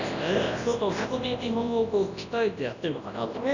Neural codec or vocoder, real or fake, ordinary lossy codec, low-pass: codec, 24 kHz, 0.9 kbps, WavTokenizer, large speech release; fake; none; 7.2 kHz